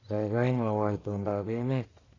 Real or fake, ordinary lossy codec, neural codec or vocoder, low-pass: fake; AAC, 32 kbps; codec, 44.1 kHz, 1.7 kbps, Pupu-Codec; 7.2 kHz